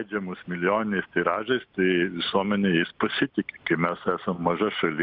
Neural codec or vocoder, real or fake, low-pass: none; real; 5.4 kHz